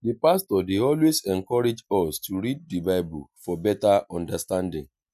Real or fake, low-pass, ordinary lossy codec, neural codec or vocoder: real; 14.4 kHz; none; none